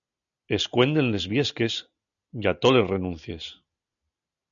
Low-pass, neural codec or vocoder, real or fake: 7.2 kHz; none; real